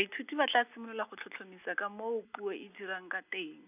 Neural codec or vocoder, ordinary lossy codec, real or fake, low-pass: none; none; real; 3.6 kHz